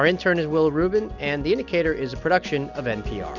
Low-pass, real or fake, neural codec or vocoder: 7.2 kHz; real; none